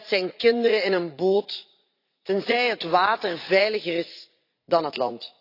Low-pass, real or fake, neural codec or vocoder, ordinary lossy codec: 5.4 kHz; fake; vocoder, 44.1 kHz, 80 mel bands, Vocos; AAC, 32 kbps